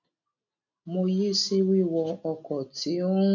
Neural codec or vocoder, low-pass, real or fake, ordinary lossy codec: none; 7.2 kHz; real; none